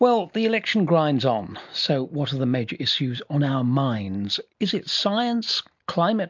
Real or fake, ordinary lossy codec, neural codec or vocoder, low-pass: real; MP3, 64 kbps; none; 7.2 kHz